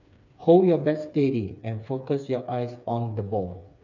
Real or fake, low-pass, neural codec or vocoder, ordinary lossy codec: fake; 7.2 kHz; codec, 16 kHz, 4 kbps, FreqCodec, smaller model; none